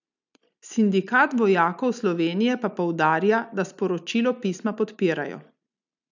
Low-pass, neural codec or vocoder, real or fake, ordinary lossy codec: 7.2 kHz; none; real; none